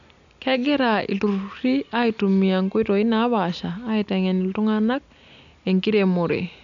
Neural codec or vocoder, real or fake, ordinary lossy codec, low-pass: none; real; none; 7.2 kHz